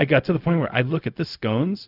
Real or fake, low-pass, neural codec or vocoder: fake; 5.4 kHz; codec, 16 kHz, 0.4 kbps, LongCat-Audio-Codec